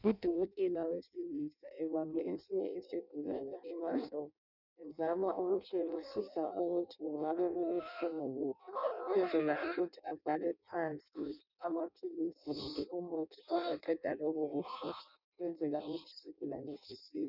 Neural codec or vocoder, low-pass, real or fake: codec, 16 kHz in and 24 kHz out, 0.6 kbps, FireRedTTS-2 codec; 5.4 kHz; fake